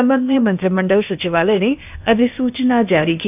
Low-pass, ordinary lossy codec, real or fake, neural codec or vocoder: 3.6 kHz; none; fake; codec, 16 kHz, 0.8 kbps, ZipCodec